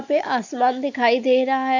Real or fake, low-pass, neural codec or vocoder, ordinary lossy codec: real; 7.2 kHz; none; AAC, 48 kbps